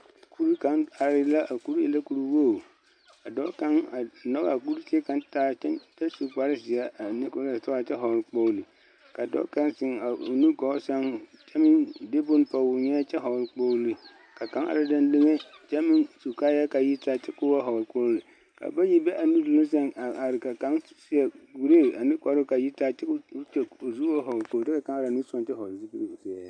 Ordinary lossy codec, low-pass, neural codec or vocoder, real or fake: MP3, 96 kbps; 9.9 kHz; none; real